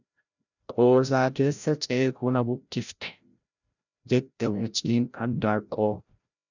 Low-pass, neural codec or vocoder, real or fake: 7.2 kHz; codec, 16 kHz, 0.5 kbps, FreqCodec, larger model; fake